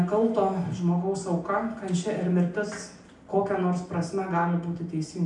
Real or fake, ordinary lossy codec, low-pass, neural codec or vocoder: real; MP3, 96 kbps; 10.8 kHz; none